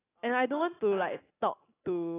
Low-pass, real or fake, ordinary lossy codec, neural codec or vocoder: 3.6 kHz; real; AAC, 16 kbps; none